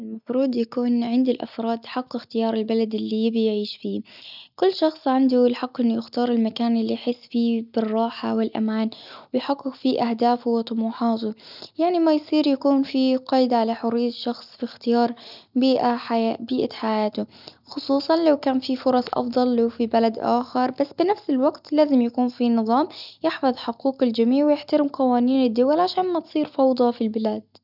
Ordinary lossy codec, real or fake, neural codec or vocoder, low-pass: none; real; none; 5.4 kHz